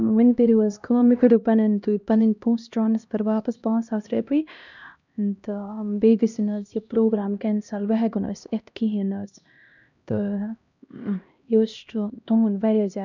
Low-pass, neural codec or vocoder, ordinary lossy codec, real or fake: 7.2 kHz; codec, 16 kHz, 1 kbps, X-Codec, HuBERT features, trained on LibriSpeech; none; fake